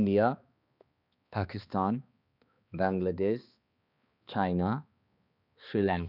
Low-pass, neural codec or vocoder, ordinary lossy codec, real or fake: 5.4 kHz; codec, 16 kHz, 2 kbps, X-Codec, HuBERT features, trained on balanced general audio; none; fake